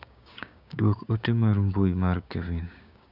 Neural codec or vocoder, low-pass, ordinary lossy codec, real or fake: codec, 16 kHz, 6 kbps, DAC; 5.4 kHz; none; fake